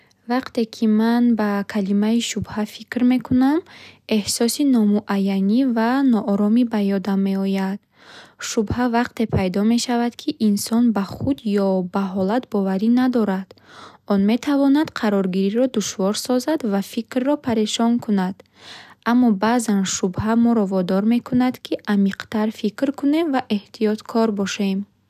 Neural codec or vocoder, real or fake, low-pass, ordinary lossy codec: none; real; 14.4 kHz; none